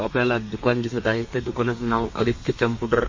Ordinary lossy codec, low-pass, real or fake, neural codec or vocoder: MP3, 32 kbps; 7.2 kHz; fake; codec, 32 kHz, 1.9 kbps, SNAC